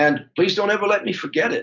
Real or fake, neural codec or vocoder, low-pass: real; none; 7.2 kHz